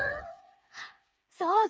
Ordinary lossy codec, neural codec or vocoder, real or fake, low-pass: none; codec, 16 kHz, 4 kbps, FreqCodec, smaller model; fake; none